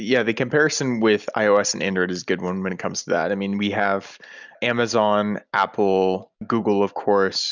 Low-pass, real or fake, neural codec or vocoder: 7.2 kHz; real; none